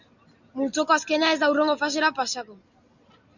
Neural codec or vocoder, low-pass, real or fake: none; 7.2 kHz; real